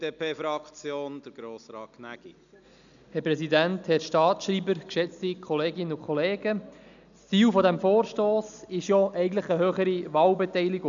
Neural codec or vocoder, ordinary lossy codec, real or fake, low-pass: none; none; real; 7.2 kHz